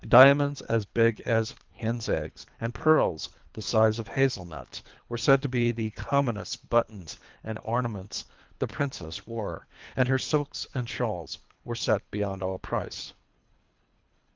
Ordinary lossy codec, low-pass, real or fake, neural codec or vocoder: Opus, 32 kbps; 7.2 kHz; fake; codec, 24 kHz, 3 kbps, HILCodec